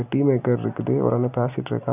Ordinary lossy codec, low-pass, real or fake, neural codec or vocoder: none; 3.6 kHz; real; none